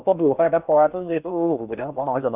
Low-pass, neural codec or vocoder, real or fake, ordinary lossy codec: 3.6 kHz; codec, 16 kHz in and 24 kHz out, 0.6 kbps, FocalCodec, streaming, 4096 codes; fake; none